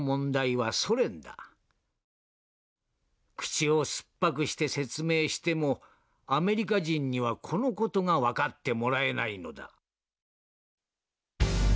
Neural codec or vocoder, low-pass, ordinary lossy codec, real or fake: none; none; none; real